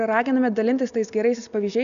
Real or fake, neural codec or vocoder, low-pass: real; none; 7.2 kHz